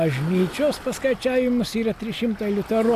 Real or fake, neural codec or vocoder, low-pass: real; none; 14.4 kHz